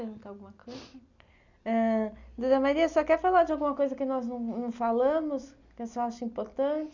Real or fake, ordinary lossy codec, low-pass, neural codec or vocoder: real; none; 7.2 kHz; none